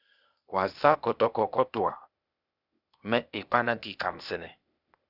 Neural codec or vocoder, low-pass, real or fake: codec, 16 kHz, 0.8 kbps, ZipCodec; 5.4 kHz; fake